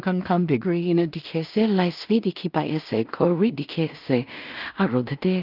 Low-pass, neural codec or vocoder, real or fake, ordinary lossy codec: 5.4 kHz; codec, 16 kHz in and 24 kHz out, 0.4 kbps, LongCat-Audio-Codec, two codebook decoder; fake; Opus, 24 kbps